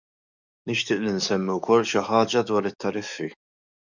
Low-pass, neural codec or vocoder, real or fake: 7.2 kHz; codec, 44.1 kHz, 7.8 kbps, DAC; fake